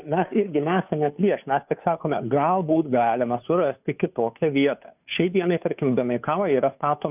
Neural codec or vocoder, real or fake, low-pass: codec, 16 kHz, 2 kbps, FunCodec, trained on Chinese and English, 25 frames a second; fake; 3.6 kHz